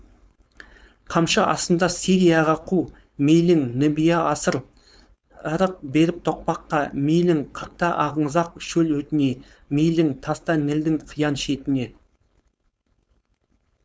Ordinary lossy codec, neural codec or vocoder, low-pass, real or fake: none; codec, 16 kHz, 4.8 kbps, FACodec; none; fake